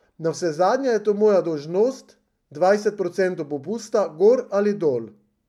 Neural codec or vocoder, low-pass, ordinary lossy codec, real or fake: none; 19.8 kHz; MP3, 96 kbps; real